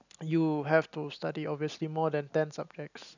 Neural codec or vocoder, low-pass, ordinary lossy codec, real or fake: none; 7.2 kHz; none; real